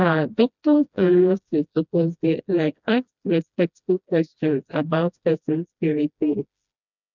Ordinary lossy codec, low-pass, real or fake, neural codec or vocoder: none; 7.2 kHz; fake; codec, 16 kHz, 1 kbps, FreqCodec, smaller model